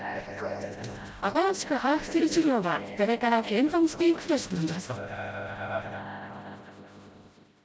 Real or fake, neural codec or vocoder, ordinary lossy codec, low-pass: fake; codec, 16 kHz, 0.5 kbps, FreqCodec, smaller model; none; none